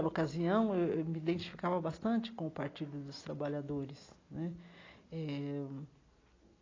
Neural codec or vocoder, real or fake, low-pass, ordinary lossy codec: none; real; 7.2 kHz; AAC, 32 kbps